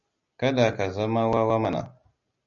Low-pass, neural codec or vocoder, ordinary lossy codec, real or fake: 7.2 kHz; none; MP3, 64 kbps; real